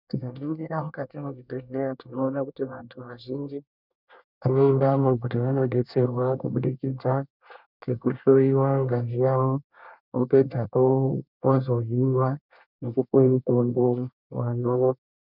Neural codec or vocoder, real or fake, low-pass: codec, 24 kHz, 1 kbps, SNAC; fake; 5.4 kHz